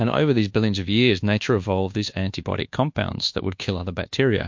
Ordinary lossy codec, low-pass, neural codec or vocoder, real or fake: MP3, 48 kbps; 7.2 kHz; codec, 24 kHz, 1.2 kbps, DualCodec; fake